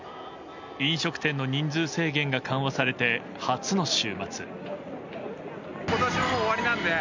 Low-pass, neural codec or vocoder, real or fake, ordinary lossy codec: 7.2 kHz; none; real; MP3, 64 kbps